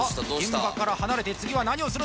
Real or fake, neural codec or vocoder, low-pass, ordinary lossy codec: real; none; none; none